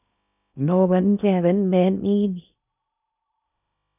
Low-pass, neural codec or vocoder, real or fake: 3.6 kHz; codec, 16 kHz in and 24 kHz out, 0.6 kbps, FocalCodec, streaming, 2048 codes; fake